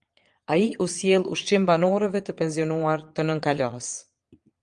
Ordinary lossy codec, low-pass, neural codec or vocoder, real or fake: Opus, 32 kbps; 9.9 kHz; vocoder, 22.05 kHz, 80 mel bands, Vocos; fake